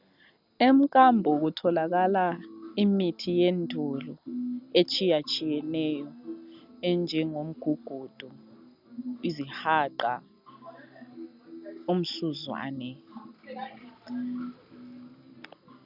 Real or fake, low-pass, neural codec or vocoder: real; 5.4 kHz; none